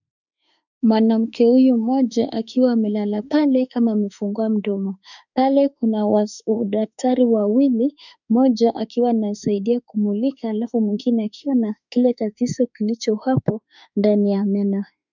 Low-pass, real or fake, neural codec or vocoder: 7.2 kHz; fake; autoencoder, 48 kHz, 32 numbers a frame, DAC-VAE, trained on Japanese speech